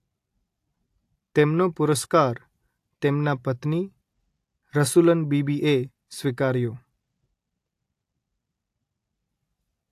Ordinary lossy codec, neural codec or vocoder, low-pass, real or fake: AAC, 64 kbps; none; 14.4 kHz; real